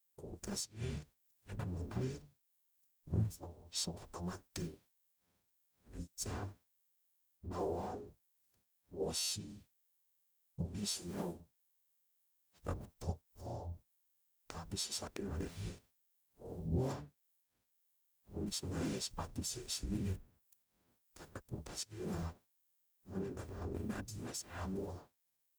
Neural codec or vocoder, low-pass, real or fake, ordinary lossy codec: codec, 44.1 kHz, 0.9 kbps, DAC; none; fake; none